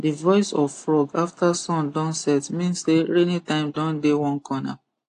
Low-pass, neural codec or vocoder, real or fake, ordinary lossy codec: 10.8 kHz; none; real; AAC, 48 kbps